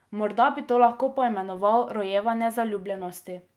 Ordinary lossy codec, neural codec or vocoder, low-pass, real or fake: Opus, 32 kbps; autoencoder, 48 kHz, 128 numbers a frame, DAC-VAE, trained on Japanese speech; 19.8 kHz; fake